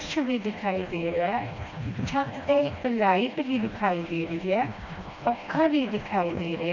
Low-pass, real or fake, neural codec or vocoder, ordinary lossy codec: 7.2 kHz; fake; codec, 16 kHz, 1 kbps, FreqCodec, smaller model; none